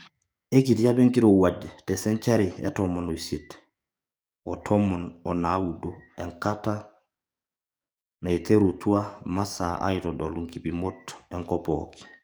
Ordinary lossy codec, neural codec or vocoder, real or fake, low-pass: none; codec, 44.1 kHz, 7.8 kbps, DAC; fake; none